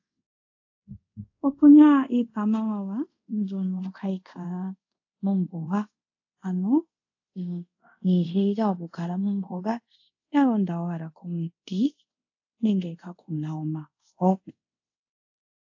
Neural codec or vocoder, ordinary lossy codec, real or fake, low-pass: codec, 24 kHz, 0.5 kbps, DualCodec; AAC, 48 kbps; fake; 7.2 kHz